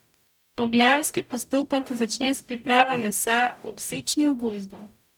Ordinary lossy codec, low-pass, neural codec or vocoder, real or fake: none; 19.8 kHz; codec, 44.1 kHz, 0.9 kbps, DAC; fake